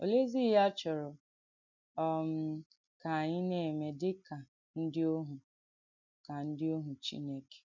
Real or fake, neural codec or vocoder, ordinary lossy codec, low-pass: real; none; none; 7.2 kHz